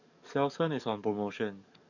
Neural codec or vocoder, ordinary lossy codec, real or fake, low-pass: codec, 44.1 kHz, 7.8 kbps, DAC; none; fake; 7.2 kHz